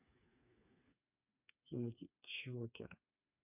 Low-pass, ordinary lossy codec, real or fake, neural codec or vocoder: 3.6 kHz; none; fake; codec, 16 kHz, 4 kbps, FreqCodec, smaller model